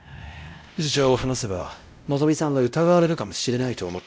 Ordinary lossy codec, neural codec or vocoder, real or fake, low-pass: none; codec, 16 kHz, 0.5 kbps, X-Codec, WavLM features, trained on Multilingual LibriSpeech; fake; none